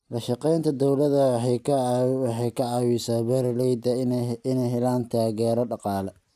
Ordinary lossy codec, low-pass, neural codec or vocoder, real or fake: none; 14.4 kHz; none; real